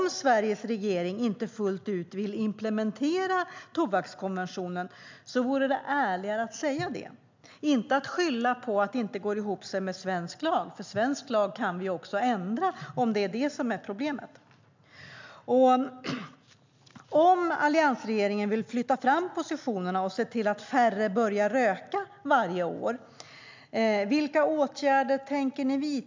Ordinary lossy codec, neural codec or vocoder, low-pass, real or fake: MP3, 64 kbps; none; 7.2 kHz; real